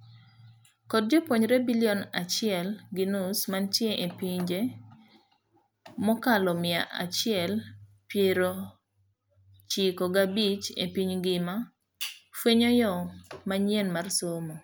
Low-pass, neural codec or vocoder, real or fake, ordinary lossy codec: none; none; real; none